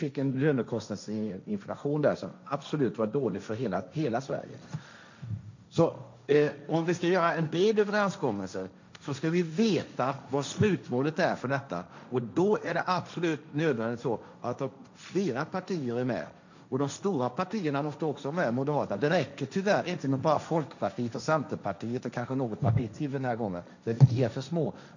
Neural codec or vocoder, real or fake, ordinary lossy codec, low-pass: codec, 16 kHz, 1.1 kbps, Voila-Tokenizer; fake; none; 7.2 kHz